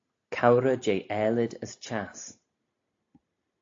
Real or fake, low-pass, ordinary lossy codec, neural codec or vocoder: real; 7.2 kHz; AAC, 48 kbps; none